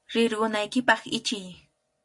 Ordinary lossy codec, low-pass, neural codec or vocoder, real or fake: MP3, 48 kbps; 10.8 kHz; none; real